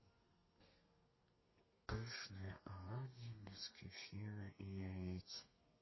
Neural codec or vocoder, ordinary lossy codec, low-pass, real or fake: codec, 32 kHz, 1.9 kbps, SNAC; MP3, 24 kbps; 7.2 kHz; fake